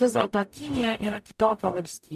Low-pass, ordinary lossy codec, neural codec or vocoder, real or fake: 14.4 kHz; MP3, 96 kbps; codec, 44.1 kHz, 0.9 kbps, DAC; fake